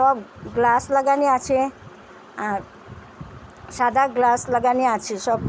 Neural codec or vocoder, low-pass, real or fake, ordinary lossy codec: none; none; real; none